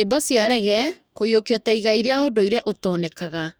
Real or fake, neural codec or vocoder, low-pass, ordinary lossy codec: fake; codec, 44.1 kHz, 2.6 kbps, DAC; none; none